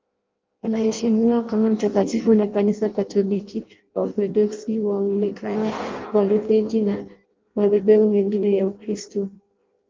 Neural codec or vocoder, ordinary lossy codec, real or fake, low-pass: codec, 16 kHz in and 24 kHz out, 0.6 kbps, FireRedTTS-2 codec; Opus, 24 kbps; fake; 7.2 kHz